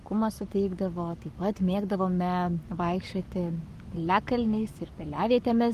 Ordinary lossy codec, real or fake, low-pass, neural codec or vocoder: Opus, 32 kbps; fake; 14.4 kHz; codec, 44.1 kHz, 7.8 kbps, Pupu-Codec